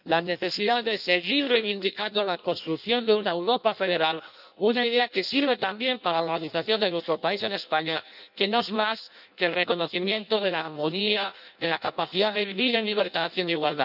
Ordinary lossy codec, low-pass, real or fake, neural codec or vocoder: none; 5.4 kHz; fake; codec, 16 kHz in and 24 kHz out, 0.6 kbps, FireRedTTS-2 codec